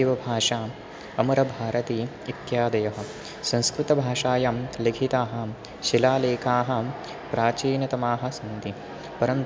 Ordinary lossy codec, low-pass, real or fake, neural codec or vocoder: none; none; real; none